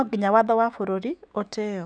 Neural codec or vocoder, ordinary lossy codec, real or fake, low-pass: autoencoder, 48 kHz, 128 numbers a frame, DAC-VAE, trained on Japanese speech; none; fake; 9.9 kHz